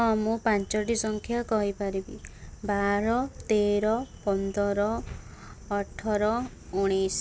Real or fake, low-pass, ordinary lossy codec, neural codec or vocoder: real; none; none; none